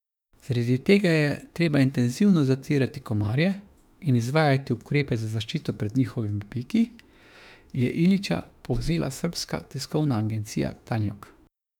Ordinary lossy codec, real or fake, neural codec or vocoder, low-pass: none; fake; autoencoder, 48 kHz, 32 numbers a frame, DAC-VAE, trained on Japanese speech; 19.8 kHz